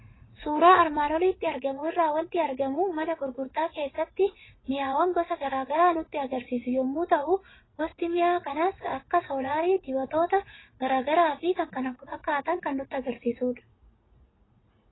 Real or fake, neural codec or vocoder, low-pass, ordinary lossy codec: fake; vocoder, 44.1 kHz, 80 mel bands, Vocos; 7.2 kHz; AAC, 16 kbps